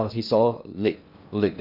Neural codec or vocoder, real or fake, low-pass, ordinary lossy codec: codec, 16 kHz in and 24 kHz out, 0.6 kbps, FocalCodec, streaming, 2048 codes; fake; 5.4 kHz; none